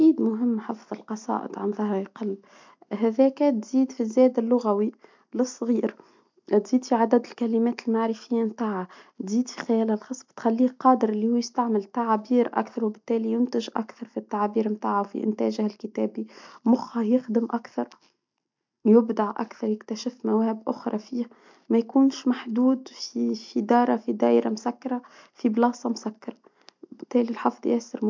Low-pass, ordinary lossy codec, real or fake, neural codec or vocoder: 7.2 kHz; MP3, 64 kbps; real; none